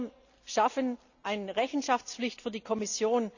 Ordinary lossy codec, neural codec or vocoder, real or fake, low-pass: none; none; real; 7.2 kHz